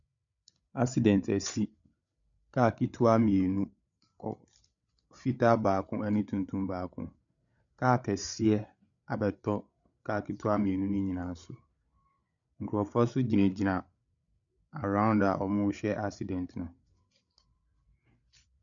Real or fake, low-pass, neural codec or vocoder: fake; 7.2 kHz; codec, 16 kHz, 8 kbps, FreqCodec, larger model